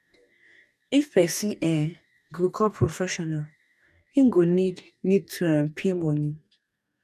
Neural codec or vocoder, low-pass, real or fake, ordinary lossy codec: codec, 44.1 kHz, 2.6 kbps, DAC; 14.4 kHz; fake; none